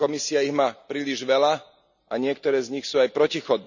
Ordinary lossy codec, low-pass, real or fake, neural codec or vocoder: none; 7.2 kHz; real; none